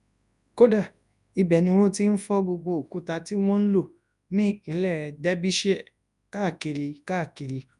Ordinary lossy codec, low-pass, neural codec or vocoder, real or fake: none; 10.8 kHz; codec, 24 kHz, 0.9 kbps, WavTokenizer, large speech release; fake